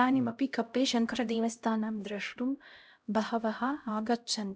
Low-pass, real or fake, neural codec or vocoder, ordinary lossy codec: none; fake; codec, 16 kHz, 0.5 kbps, X-Codec, HuBERT features, trained on LibriSpeech; none